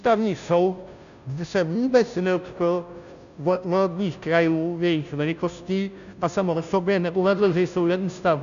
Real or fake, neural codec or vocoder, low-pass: fake; codec, 16 kHz, 0.5 kbps, FunCodec, trained on Chinese and English, 25 frames a second; 7.2 kHz